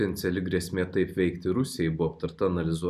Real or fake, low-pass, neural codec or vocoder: real; 14.4 kHz; none